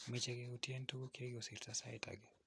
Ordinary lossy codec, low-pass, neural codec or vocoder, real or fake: none; none; none; real